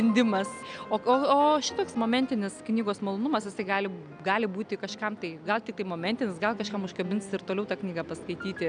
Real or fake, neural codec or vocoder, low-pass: real; none; 9.9 kHz